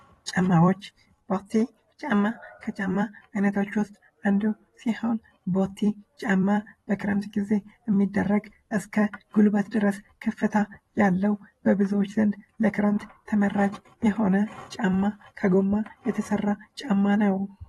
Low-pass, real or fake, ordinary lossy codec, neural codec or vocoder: 19.8 kHz; fake; AAC, 32 kbps; vocoder, 44.1 kHz, 128 mel bands every 256 samples, BigVGAN v2